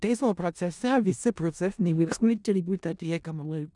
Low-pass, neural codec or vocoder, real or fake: 10.8 kHz; codec, 16 kHz in and 24 kHz out, 0.4 kbps, LongCat-Audio-Codec, four codebook decoder; fake